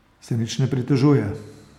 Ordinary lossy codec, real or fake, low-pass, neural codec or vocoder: MP3, 96 kbps; real; 19.8 kHz; none